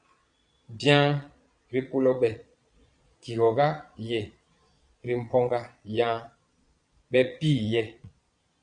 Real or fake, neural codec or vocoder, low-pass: fake; vocoder, 22.05 kHz, 80 mel bands, Vocos; 9.9 kHz